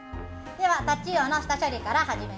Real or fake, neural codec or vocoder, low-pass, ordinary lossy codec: real; none; none; none